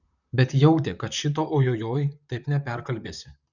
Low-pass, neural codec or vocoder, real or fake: 7.2 kHz; vocoder, 44.1 kHz, 128 mel bands, Pupu-Vocoder; fake